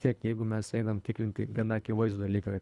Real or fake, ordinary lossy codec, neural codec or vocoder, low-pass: fake; Opus, 64 kbps; codec, 24 kHz, 3 kbps, HILCodec; 10.8 kHz